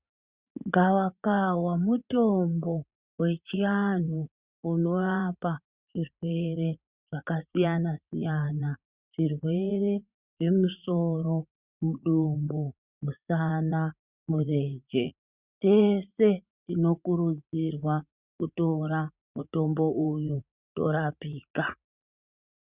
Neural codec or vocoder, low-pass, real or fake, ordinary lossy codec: vocoder, 44.1 kHz, 80 mel bands, Vocos; 3.6 kHz; fake; Opus, 64 kbps